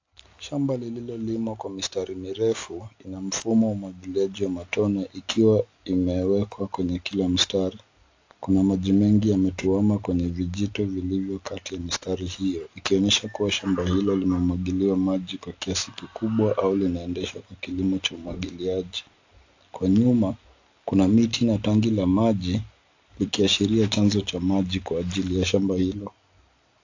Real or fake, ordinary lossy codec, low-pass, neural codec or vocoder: real; AAC, 48 kbps; 7.2 kHz; none